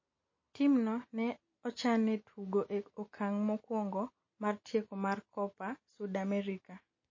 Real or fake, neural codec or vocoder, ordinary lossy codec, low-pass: real; none; MP3, 32 kbps; 7.2 kHz